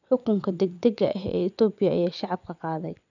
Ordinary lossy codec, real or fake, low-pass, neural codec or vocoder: none; fake; 7.2 kHz; vocoder, 22.05 kHz, 80 mel bands, WaveNeXt